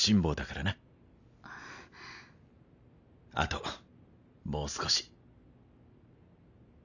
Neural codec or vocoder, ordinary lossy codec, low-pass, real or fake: none; none; 7.2 kHz; real